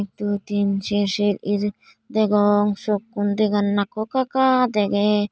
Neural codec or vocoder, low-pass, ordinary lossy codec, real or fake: none; none; none; real